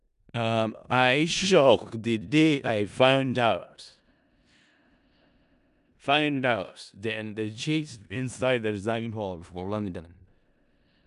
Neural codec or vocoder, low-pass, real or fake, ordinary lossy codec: codec, 16 kHz in and 24 kHz out, 0.4 kbps, LongCat-Audio-Codec, four codebook decoder; 10.8 kHz; fake; none